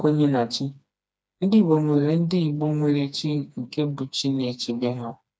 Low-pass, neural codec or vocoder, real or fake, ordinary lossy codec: none; codec, 16 kHz, 2 kbps, FreqCodec, smaller model; fake; none